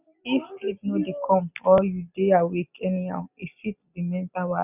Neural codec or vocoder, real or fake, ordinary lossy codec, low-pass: none; real; none; 3.6 kHz